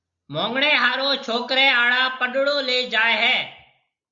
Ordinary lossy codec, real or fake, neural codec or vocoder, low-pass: Opus, 64 kbps; real; none; 7.2 kHz